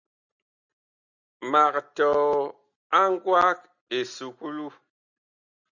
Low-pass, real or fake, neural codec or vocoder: 7.2 kHz; real; none